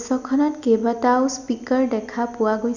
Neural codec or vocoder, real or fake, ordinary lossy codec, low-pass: none; real; none; 7.2 kHz